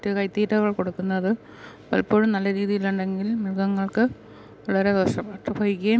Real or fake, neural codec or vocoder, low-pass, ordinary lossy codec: real; none; none; none